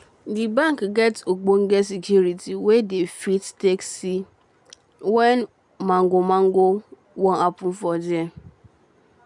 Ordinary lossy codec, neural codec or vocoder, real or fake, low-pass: none; none; real; 10.8 kHz